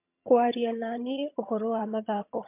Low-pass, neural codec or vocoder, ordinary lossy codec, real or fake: 3.6 kHz; vocoder, 22.05 kHz, 80 mel bands, HiFi-GAN; MP3, 32 kbps; fake